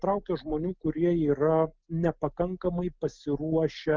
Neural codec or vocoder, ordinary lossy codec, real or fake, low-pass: none; Opus, 24 kbps; real; 7.2 kHz